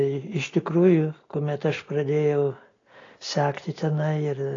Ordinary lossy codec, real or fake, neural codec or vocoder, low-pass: AAC, 32 kbps; real; none; 7.2 kHz